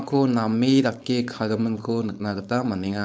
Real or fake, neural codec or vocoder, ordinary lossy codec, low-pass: fake; codec, 16 kHz, 4.8 kbps, FACodec; none; none